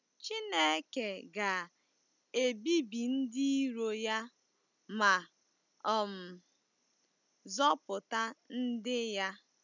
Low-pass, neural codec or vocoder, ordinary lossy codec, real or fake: 7.2 kHz; none; none; real